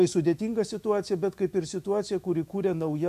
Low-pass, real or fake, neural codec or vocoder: 14.4 kHz; fake; autoencoder, 48 kHz, 128 numbers a frame, DAC-VAE, trained on Japanese speech